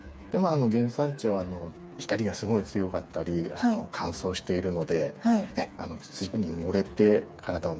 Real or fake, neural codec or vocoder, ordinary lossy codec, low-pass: fake; codec, 16 kHz, 4 kbps, FreqCodec, smaller model; none; none